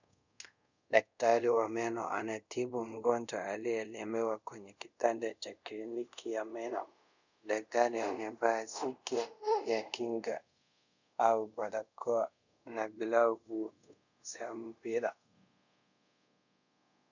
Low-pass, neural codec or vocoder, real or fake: 7.2 kHz; codec, 24 kHz, 0.5 kbps, DualCodec; fake